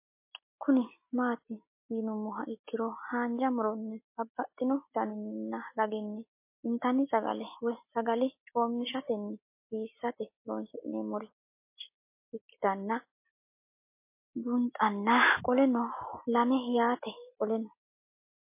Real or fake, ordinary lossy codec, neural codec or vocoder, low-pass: real; MP3, 24 kbps; none; 3.6 kHz